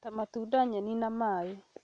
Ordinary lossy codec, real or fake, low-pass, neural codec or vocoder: none; real; 9.9 kHz; none